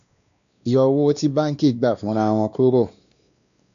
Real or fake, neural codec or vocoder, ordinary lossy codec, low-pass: fake; codec, 16 kHz, 2 kbps, X-Codec, WavLM features, trained on Multilingual LibriSpeech; none; 7.2 kHz